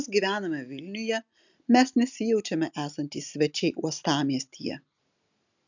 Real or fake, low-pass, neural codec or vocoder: real; 7.2 kHz; none